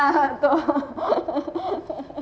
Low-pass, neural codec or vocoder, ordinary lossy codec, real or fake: none; none; none; real